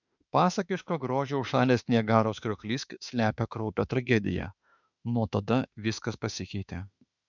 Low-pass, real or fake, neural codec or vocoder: 7.2 kHz; fake; autoencoder, 48 kHz, 32 numbers a frame, DAC-VAE, trained on Japanese speech